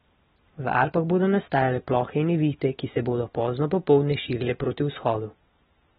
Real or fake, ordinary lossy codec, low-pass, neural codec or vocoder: real; AAC, 16 kbps; 19.8 kHz; none